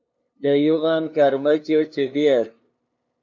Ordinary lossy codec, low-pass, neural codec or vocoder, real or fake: MP3, 48 kbps; 7.2 kHz; codec, 16 kHz, 2 kbps, FunCodec, trained on LibriTTS, 25 frames a second; fake